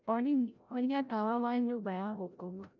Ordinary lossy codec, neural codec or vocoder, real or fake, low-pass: none; codec, 16 kHz, 0.5 kbps, FreqCodec, larger model; fake; 7.2 kHz